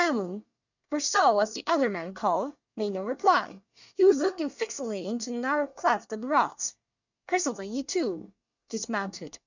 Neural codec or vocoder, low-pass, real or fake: codec, 24 kHz, 1 kbps, SNAC; 7.2 kHz; fake